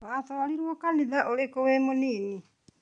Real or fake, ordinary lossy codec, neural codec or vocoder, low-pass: real; none; none; 9.9 kHz